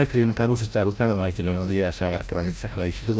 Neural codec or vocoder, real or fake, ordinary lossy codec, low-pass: codec, 16 kHz, 0.5 kbps, FreqCodec, larger model; fake; none; none